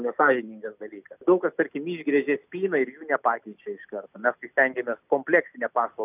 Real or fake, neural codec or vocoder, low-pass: real; none; 3.6 kHz